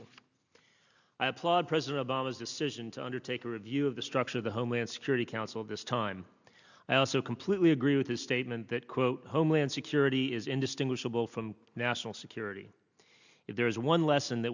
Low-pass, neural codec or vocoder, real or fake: 7.2 kHz; none; real